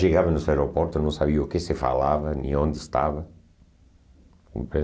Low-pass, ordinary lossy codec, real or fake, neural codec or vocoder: none; none; real; none